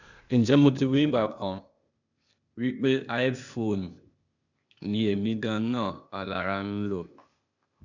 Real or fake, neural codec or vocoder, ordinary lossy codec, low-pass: fake; codec, 16 kHz, 0.8 kbps, ZipCodec; none; 7.2 kHz